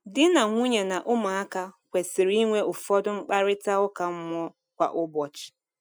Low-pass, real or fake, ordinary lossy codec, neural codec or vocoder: none; real; none; none